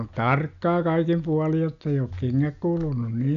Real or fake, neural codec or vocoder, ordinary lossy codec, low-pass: real; none; MP3, 96 kbps; 7.2 kHz